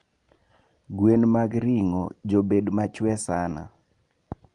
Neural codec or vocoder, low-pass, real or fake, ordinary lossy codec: none; 10.8 kHz; real; Opus, 32 kbps